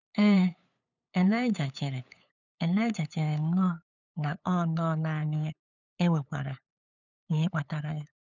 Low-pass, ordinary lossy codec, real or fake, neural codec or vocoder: 7.2 kHz; none; fake; codec, 16 kHz, 8 kbps, FunCodec, trained on LibriTTS, 25 frames a second